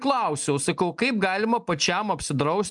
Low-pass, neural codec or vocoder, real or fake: 10.8 kHz; none; real